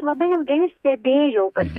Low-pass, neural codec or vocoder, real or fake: 14.4 kHz; codec, 32 kHz, 1.9 kbps, SNAC; fake